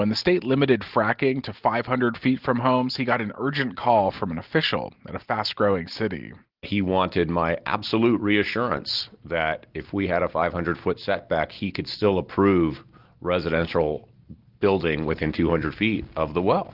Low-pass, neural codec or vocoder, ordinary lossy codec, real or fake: 5.4 kHz; none; Opus, 32 kbps; real